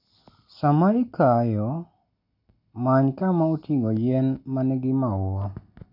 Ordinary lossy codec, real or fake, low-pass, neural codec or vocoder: none; real; 5.4 kHz; none